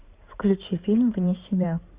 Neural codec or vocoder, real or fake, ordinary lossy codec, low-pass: codec, 16 kHz in and 24 kHz out, 2.2 kbps, FireRedTTS-2 codec; fake; Opus, 64 kbps; 3.6 kHz